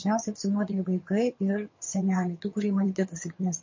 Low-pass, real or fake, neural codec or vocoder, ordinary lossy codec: 7.2 kHz; fake; vocoder, 22.05 kHz, 80 mel bands, HiFi-GAN; MP3, 32 kbps